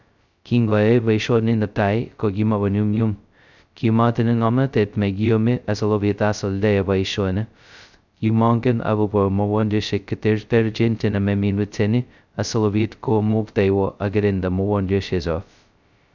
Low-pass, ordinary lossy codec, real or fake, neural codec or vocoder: 7.2 kHz; none; fake; codec, 16 kHz, 0.2 kbps, FocalCodec